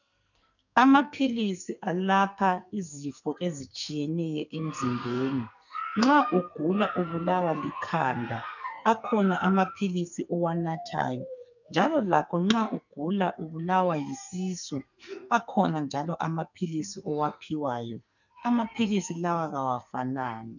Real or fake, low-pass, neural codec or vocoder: fake; 7.2 kHz; codec, 44.1 kHz, 2.6 kbps, SNAC